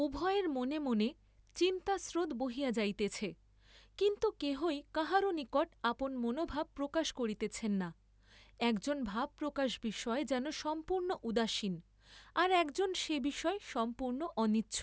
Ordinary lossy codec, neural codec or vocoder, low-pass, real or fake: none; none; none; real